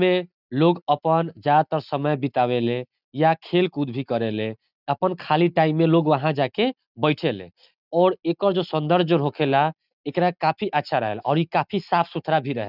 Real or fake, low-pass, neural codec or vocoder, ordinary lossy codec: real; 5.4 kHz; none; none